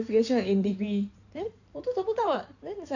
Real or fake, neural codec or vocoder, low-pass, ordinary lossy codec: fake; codec, 16 kHz in and 24 kHz out, 2.2 kbps, FireRedTTS-2 codec; 7.2 kHz; none